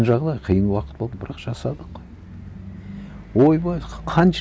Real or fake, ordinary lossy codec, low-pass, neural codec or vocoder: real; none; none; none